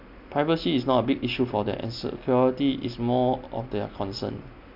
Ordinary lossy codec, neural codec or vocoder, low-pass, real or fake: none; none; 5.4 kHz; real